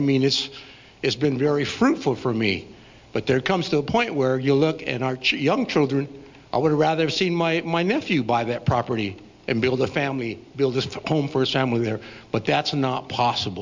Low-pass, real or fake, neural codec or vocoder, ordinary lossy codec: 7.2 kHz; real; none; MP3, 64 kbps